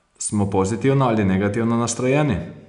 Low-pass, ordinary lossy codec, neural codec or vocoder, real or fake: 10.8 kHz; none; none; real